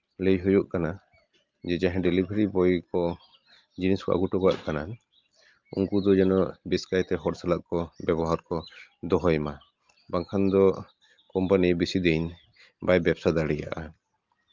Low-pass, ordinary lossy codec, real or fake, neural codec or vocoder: 7.2 kHz; Opus, 24 kbps; real; none